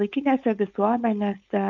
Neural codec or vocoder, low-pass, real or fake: codec, 16 kHz, 4.8 kbps, FACodec; 7.2 kHz; fake